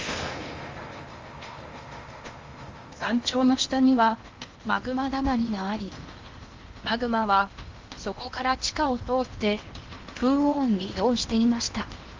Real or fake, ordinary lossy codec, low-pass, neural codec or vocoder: fake; Opus, 32 kbps; 7.2 kHz; codec, 16 kHz in and 24 kHz out, 0.8 kbps, FocalCodec, streaming, 65536 codes